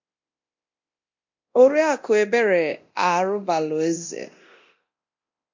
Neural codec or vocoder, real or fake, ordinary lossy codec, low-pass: codec, 24 kHz, 0.9 kbps, DualCodec; fake; MP3, 48 kbps; 7.2 kHz